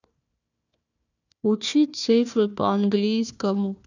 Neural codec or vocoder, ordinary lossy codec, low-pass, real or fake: codec, 16 kHz, 1 kbps, FunCodec, trained on Chinese and English, 50 frames a second; none; 7.2 kHz; fake